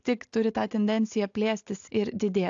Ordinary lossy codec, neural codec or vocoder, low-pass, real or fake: AAC, 64 kbps; none; 7.2 kHz; real